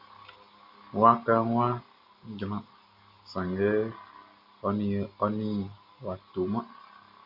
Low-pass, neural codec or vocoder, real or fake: 5.4 kHz; none; real